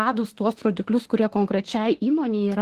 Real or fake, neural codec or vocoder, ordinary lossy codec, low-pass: fake; autoencoder, 48 kHz, 32 numbers a frame, DAC-VAE, trained on Japanese speech; Opus, 16 kbps; 14.4 kHz